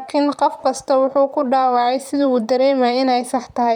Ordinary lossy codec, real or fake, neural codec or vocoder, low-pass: none; fake; vocoder, 44.1 kHz, 128 mel bands, Pupu-Vocoder; 19.8 kHz